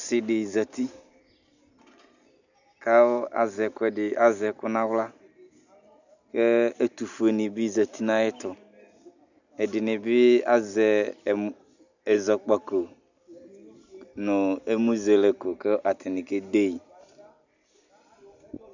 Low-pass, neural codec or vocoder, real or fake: 7.2 kHz; none; real